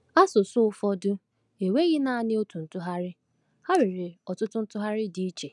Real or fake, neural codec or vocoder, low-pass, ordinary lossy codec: real; none; 10.8 kHz; none